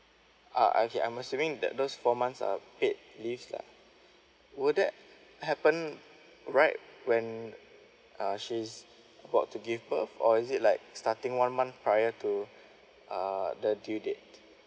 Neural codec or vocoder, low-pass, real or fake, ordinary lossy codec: none; none; real; none